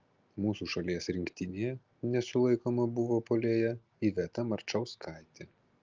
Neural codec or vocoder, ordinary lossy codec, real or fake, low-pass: none; Opus, 32 kbps; real; 7.2 kHz